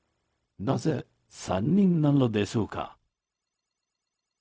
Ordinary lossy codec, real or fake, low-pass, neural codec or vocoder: none; fake; none; codec, 16 kHz, 0.4 kbps, LongCat-Audio-Codec